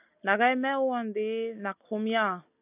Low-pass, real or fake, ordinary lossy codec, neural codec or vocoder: 3.6 kHz; real; AAC, 32 kbps; none